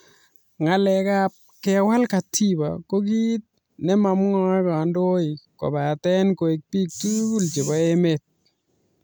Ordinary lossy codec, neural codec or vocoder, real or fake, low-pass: none; none; real; none